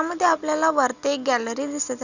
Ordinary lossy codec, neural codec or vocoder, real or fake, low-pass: none; none; real; 7.2 kHz